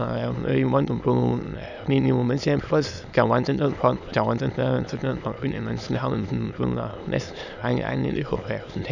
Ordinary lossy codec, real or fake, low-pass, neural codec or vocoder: none; fake; 7.2 kHz; autoencoder, 22.05 kHz, a latent of 192 numbers a frame, VITS, trained on many speakers